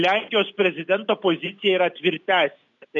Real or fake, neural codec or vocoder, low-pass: real; none; 7.2 kHz